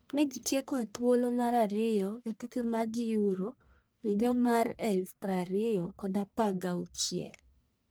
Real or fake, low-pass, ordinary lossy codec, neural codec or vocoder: fake; none; none; codec, 44.1 kHz, 1.7 kbps, Pupu-Codec